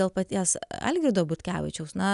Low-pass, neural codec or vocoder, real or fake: 10.8 kHz; none; real